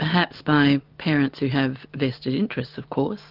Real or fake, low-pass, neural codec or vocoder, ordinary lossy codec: real; 5.4 kHz; none; Opus, 24 kbps